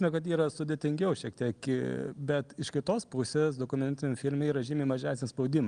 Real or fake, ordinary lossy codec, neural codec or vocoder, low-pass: real; Opus, 32 kbps; none; 9.9 kHz